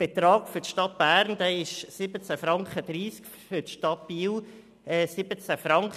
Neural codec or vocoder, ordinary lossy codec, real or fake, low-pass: none; none; real; 14.4 kHz